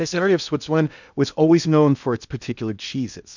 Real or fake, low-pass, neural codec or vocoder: fake; 7.2 kHz; codec, 16 kHz in and 24 kHz out, 0.6 kbps, FocalCodec, streaming, 2048 codes